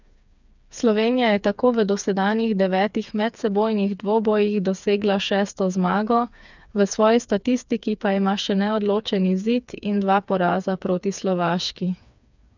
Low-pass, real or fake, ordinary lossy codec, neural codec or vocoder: 7.2 kHz; fake; none; codec, 16 kHz, 4 kbps, FreqCodec, smaller model